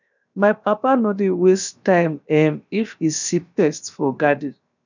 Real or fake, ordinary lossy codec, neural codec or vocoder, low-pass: fake; none; codec, 16 kHz, 0.7 kbps, FocalCodec; 7.2 kHz